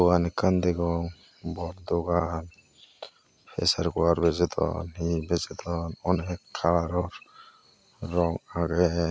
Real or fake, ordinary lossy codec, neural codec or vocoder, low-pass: real; none; none; none